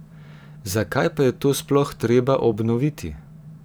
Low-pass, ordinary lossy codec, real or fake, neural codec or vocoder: none; none; fake; vocoder, 44.1 kHz, 128 mel bands every 512 samples, BigVGAN v2